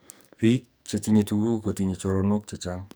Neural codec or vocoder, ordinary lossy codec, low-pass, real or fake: codec, 44.1 kHz, 2.6 kbps, SNAC; none; none; fake